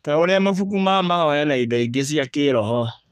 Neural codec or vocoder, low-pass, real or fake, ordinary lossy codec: codec, 32 kHz, 1.9 kbps, SNAC; 14.4 kHz; fake; none